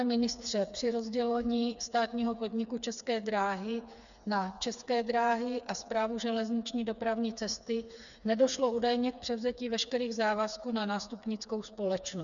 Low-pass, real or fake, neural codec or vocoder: 7.2 kHz; fake; codec, 16 kHz, 4 kbps, FreqCodec, smaller model